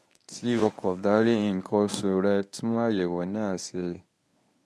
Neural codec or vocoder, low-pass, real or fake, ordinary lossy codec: codec, 24 kHz, 0.9 kbps, WavTokenizer, medium speech release version 1; none; fake; none